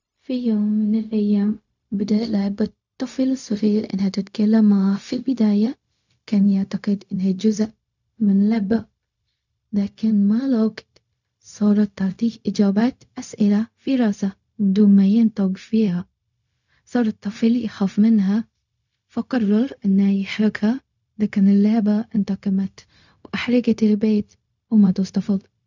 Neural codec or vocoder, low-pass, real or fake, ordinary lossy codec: codec, 16 kHz, 0.4 kbps, LongCat-Audio-Codec; 7.2 kHz; fake; none